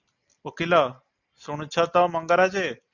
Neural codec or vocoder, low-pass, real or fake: none; 7.2 kHz; real